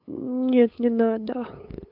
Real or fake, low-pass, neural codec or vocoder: fake; 5.4 kHz; codec, 16 kHz, 8 kbps, FunCodec, trained on LibriTTS, 25 frames a second